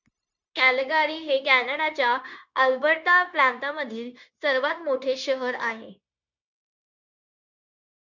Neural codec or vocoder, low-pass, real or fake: codec, 16 kHz, 0.9 kbps, LongCat-Audio-Codec; 7.2 kHz; fake